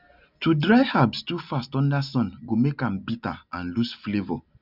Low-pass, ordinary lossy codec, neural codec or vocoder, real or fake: 5.4 kHz; none; none; real